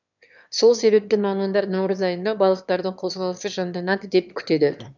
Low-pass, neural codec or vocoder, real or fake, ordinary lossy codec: 7.2 kHz; autoencoder, 22.05 kHz, a latent of 192 numbers a frame, VITS, trained on one speaker; fake; none